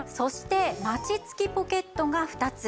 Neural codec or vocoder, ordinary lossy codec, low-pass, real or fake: none; none; none; real